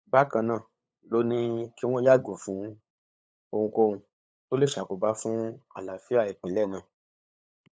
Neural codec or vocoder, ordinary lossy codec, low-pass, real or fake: codec, 16 kHz, 8 kbps, FunCodec, trained on LibriTTS, 25 frames a second; none; none; fake